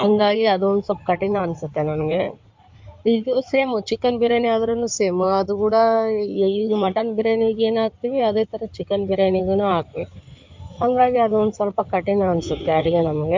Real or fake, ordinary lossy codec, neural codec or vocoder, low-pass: fake; MP3, 64 kbps; codec, 16 kHz in and 24 kHz out, 2.2 kbps, FireRedTTS-2 codec; 7.2 kHz